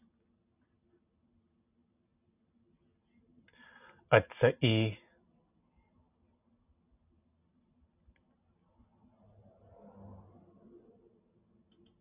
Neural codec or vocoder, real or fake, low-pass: none; real; 3.6 kHz